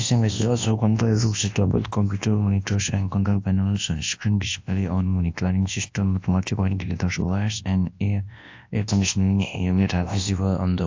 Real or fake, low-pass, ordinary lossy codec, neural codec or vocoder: fake; 7.2 kHz; AAC, 48 kbps; codec, 24 kHz, 0.9 kbps, WavTokenizer, large speech release